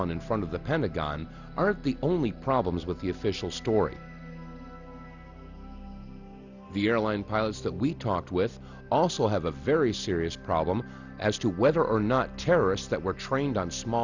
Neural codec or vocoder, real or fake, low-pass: none; real; 7.2 kHz